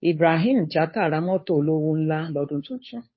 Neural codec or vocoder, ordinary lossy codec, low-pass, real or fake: codec, 16 kHz, 2 kbps, FunCodec, trained on Chinese and English, 25 frames a second; MP3, 24 kbps; 7.2 kHz; fake